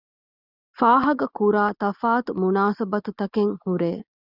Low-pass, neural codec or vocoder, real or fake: 5.4 kHz; none; real